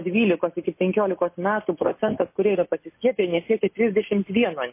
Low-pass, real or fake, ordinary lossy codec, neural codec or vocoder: 3.6 kHz; real; MP3, 24 kbps; none